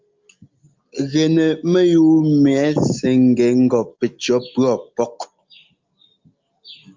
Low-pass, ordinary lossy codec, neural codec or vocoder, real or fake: 7.2 kHz; Opus, 24 kbps; none; real